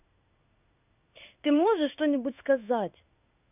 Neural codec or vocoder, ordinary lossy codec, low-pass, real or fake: codec, 16 kHz in and 24 kHz out, 1 kbps, XY-Tokenizer; none; 3.6 kHz; fake